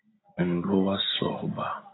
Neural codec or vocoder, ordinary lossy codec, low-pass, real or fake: none; AAC, 16 kbps; 7.2 kHz; real